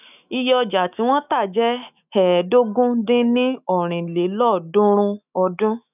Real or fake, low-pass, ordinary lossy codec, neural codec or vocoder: fake; 3.6 kHz; none; autoencoder, 48 kHz, 128 numbers a frame, DAC-VAE, trained on Japanese speech